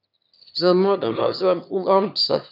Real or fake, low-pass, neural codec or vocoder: fake; 5.4 kHz; autoencoder, 22.05 kHz, a latent of 192 numbers a frame, VITS, trained on one speaker